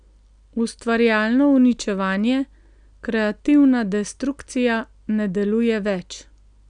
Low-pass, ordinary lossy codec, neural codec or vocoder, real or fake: 9.9 kHz; none; none; real